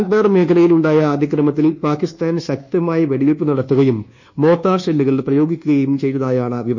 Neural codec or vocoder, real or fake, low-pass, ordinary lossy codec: codec, 24 kHz, 1.2 kbps, DualCodec; fake; 7.2 kHz; none